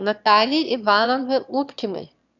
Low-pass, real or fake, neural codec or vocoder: 7.2 kHz; fake; autoencoder, 22.05 kHz, a latent of 192 numbers a frame, VITS, trained on one speaker